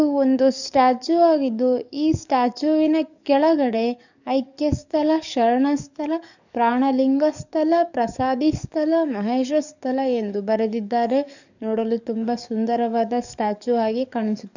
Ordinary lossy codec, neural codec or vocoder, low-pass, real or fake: none; codec, 44.1 kHz, 7.8 kbps, DAC; 7.2 kHz; fake